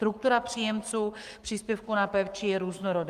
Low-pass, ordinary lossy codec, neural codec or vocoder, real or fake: 14.4 kHz; Opus, 32 kbps; codec, 44.1 kHz, 7.8 kbps, Pupu-Codec; fake